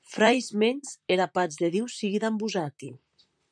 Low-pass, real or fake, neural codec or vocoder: 9.9 kHz; fake; vocoder, 44.1 kHz, 128 mel bands, Pupu-Vocoder